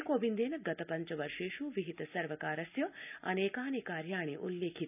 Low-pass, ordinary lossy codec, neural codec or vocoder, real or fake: 3.6 kHz; none; none; real